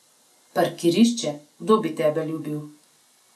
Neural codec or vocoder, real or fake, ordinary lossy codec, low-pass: none; real; none; none